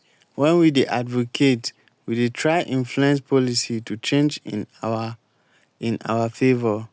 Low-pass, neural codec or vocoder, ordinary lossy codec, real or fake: none; none; none; real